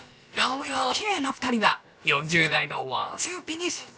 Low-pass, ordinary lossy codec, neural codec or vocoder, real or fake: none; none; codec, 16 kHz, about 1 kbps, DyCAST, with the encoder's durations; fake